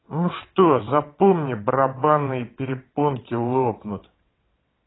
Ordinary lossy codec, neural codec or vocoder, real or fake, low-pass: AAC, 16 kbps; vocoder, 22.05 kHz, 80 mel bands, WaveNeXt; fake; 7.2 kHz